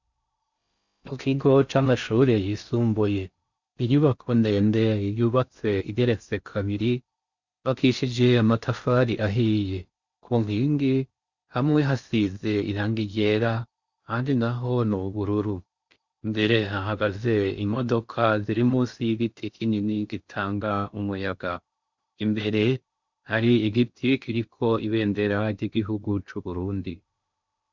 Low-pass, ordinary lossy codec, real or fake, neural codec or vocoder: 7.2 kHz; Opus, 64 kbps; fake; codec, 16 kHz in and 24 kHz out, 0.6 kbps, FocalCodec, streaming, 4096 codes